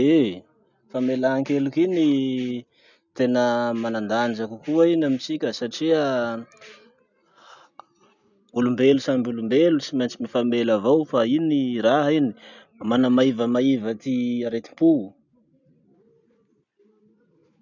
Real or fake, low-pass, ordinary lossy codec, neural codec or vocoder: real; 7.2 kHz; none; none